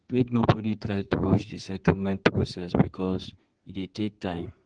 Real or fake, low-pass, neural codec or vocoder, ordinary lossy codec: fake; 9.9 kHz; codec, 32 kHz, 1.9 kbps, SNAC; Opus, 16 kbps